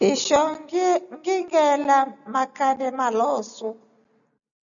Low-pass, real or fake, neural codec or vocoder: 7.2 kHz; real; none